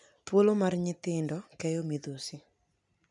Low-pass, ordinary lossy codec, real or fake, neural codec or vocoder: 10.8 kHz; none; real; none